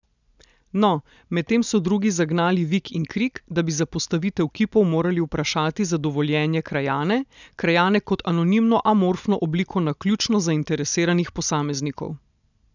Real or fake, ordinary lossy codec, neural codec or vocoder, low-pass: real; none; none; 7.2 kHz